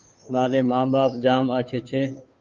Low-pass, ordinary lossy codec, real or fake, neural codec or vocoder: 7.2 kHz; Opus, 24 kbps; fake; codec, 16 kHz, 2 kbps, FunCodec, trained on Chinese and English, 25 frames a second